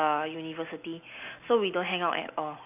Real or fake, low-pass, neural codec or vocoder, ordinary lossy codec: real; 3.6 kHz; none; AAC, 32 kbps